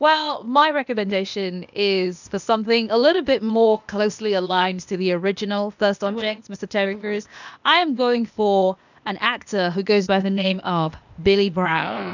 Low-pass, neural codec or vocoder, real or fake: 7.2 kHz; codec, 16 kHz, 0.8 kbps, ZipCodec; fake